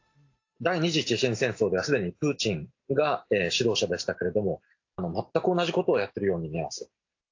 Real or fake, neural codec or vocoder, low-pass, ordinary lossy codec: real; none; 7.2 kHz; AAC, 48 kbps